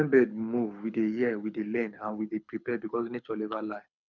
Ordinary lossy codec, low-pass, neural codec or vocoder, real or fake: none; 7.2 kHz; codec, 24 kHz, 6 kbps, HILCodec; fake